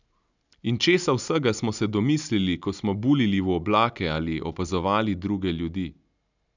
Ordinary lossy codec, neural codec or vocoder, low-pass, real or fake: none; none; 7.2 kHz; real